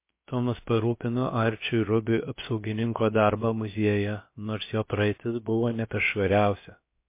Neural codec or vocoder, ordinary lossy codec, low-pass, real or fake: codec, 16 kHz, about 1 kbps, DyCAST, with the encoder's durations; MP3, 24 kbps; 3.6 kHz; fake